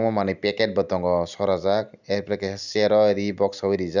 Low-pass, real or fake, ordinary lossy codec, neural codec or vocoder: 7.2 kHz; real; none; none